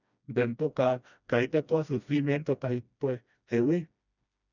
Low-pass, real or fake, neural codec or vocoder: 7.2 kHz; fake; codec, 16 kHz, 1 kbps, FreqCodec, smaller model